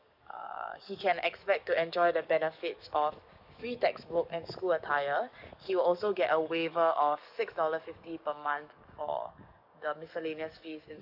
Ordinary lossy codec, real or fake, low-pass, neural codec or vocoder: none; fake; 5.4 kHz; codec, 44.1 kHz, 7.8 kbps, DAC